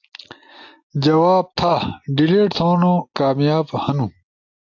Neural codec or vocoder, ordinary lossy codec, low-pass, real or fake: none; AAC, 48 kbps; 7.2 kHz; real